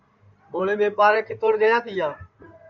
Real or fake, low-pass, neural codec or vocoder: fake; 7.2 kHz; codec, 16 kHz in and 24 kHz out, 2.2 kbps, FireRedTTS-2 codec